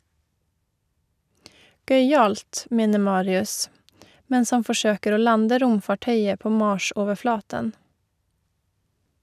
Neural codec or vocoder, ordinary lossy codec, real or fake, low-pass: none; none; real; 14.4 kHz